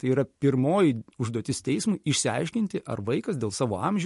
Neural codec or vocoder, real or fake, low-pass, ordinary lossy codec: none; real; 14.4 kHz; MP3, 48 kbps